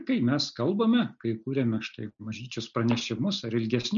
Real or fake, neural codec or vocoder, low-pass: real; none; 7.2 kHz